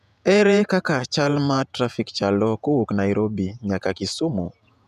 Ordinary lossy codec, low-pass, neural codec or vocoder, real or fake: none; 14.4 kHz; vocoder, 48 kHz, 128 mel bands, Vocos; fake